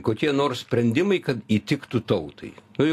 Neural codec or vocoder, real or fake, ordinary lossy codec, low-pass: none; real; AAC, 64 kbps; 14.4 kHz